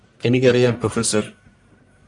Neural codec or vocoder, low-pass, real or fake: codec, 44.1 kHz, 1.7 kbps, Pupu-Codec; 10.8 kHz; fake